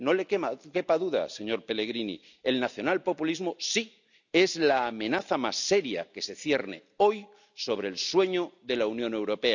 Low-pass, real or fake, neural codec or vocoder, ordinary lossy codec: 7.2 kHz; real; none; none